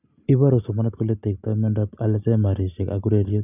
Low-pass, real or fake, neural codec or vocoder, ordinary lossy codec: 3.6 kHz; real; none; none